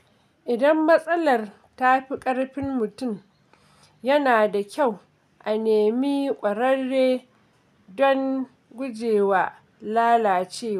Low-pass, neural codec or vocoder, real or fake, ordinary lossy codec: 14.4 kHz; none; real; none